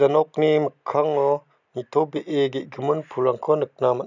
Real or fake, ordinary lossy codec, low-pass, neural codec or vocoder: real; none; 7.2 kHz; none